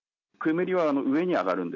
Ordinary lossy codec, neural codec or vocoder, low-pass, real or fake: AAC, 48 kbps; none; 7.2 kHz; real